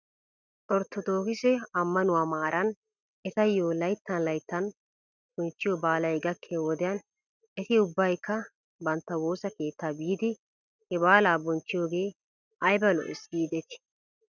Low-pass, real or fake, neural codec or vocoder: 7.2 kHz; real; none